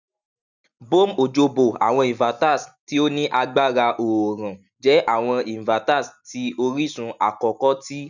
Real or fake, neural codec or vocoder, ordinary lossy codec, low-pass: real; none; none; 7.2 kHz